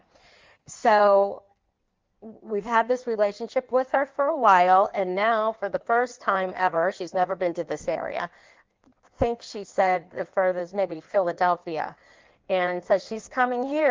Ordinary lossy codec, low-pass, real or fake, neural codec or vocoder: Opus, 32 kbps; 7.2 kHz; fake; codec, 16 kHz in and 24 kHz out, 1.1 kbps, FireRedTTS-2 codec